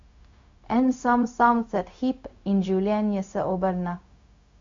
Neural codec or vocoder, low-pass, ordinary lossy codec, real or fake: codec, 16 kHz, 0.4 kbps, LongCat-Audio-Codec; 7.2 kHz; MP3, 48 kbps; fake